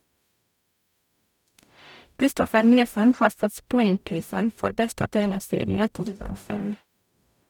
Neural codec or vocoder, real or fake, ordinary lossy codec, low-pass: codec, 44.1 kHz, 0.9 kbps, DAC; fake; none; 19.8 kHz